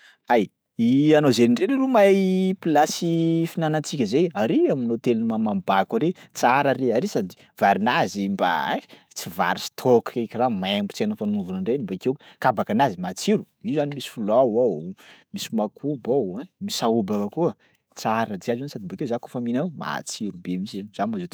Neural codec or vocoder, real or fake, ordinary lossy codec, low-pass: autoencoder, 48 kHz, 128 numbers a frame, DAC-VAE, trained on Japanese speech; fake; none; none